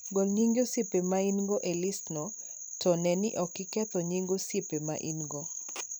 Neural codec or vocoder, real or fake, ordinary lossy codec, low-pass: vocoder, 44.1 kHz, 128 mel bands every 256 samples, BigVGAN v2; fake; none; none